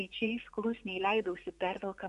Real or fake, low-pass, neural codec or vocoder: fake; 10.8 kHz; vocoder, 24 kHz, 100 mel bands, Vocos